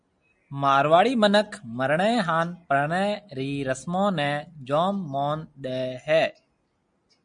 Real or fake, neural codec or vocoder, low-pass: real; none; 9.9 kHz